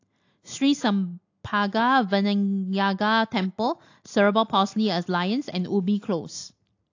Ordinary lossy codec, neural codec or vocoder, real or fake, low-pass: AAC, 48 kbps; none; real; 7.2 kHz